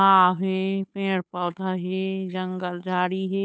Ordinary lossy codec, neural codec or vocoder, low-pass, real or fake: none; codec, 16 kHz, 8 kbps, FunCodec, trained on Chinese and English, 25 frames a second; none; fake